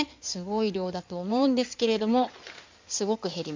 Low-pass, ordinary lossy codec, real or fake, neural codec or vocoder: 7.2 kHz; MP3, 64 kbps; fake; codec, 16 kHz in and 24 kHz out, 2.2 kbps, FireRedTTS-2 codec